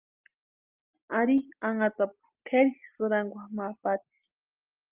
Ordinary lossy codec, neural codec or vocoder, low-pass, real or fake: Opus, 32 kbps; none; 3.6 kHz; real